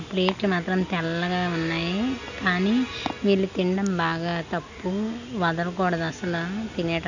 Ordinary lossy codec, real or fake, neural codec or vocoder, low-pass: none; real; none; 7.2 kHz